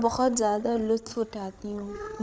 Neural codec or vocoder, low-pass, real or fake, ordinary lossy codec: codec, 16 kHz, 8 kbps, FreqCodec, larger model; none; fake; none